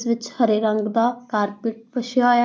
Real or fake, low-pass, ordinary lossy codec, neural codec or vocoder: real; none; none; none